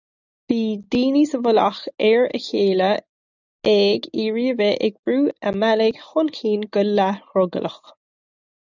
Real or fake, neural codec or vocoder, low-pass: real; none; 7.2 kHz